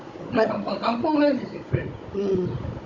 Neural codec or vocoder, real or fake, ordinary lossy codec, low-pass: codec, 16 kHz, 16 kbps, FunCodec, trained on Chinese and English, 50 frames a second; fake; Opus, 64 kbps; 7.2 kHz